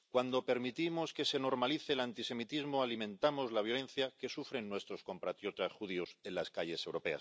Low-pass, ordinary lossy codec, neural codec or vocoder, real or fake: none; none; none; real